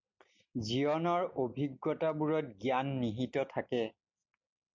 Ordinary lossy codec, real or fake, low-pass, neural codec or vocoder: MP3, 64 kbps; real; 7.2 kHz; none